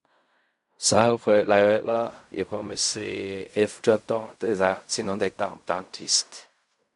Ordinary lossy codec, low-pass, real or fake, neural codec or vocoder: none; 10.8 kHz; fake; codec, 16 kHz in and 24 kHz out, 0.4 kbps, LongCat-Audio-Codec, fine tuned four codebook decoder